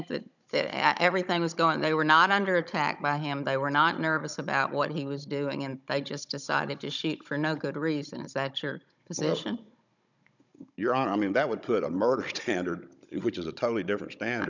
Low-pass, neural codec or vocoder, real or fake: 7.2 kHz; codec, 16 kHz, 16 kbps, FunCodec, trained on Chinese and English, 50 frames a second; fake